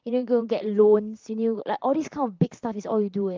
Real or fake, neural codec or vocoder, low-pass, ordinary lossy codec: fake; vocoder, 44.1 kHz, 128 mel bands, Pupu-Vocoder; 7.2 kHz; Opus, 24 kbps